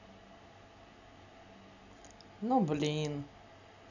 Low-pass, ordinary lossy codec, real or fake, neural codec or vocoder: 7.2 kHz; none; real; none